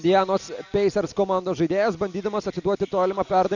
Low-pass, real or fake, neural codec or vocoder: 7.2 kHz; real; none